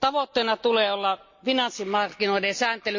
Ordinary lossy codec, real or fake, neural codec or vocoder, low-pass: MP3, 48 kbps; real; none; 7.2 kHz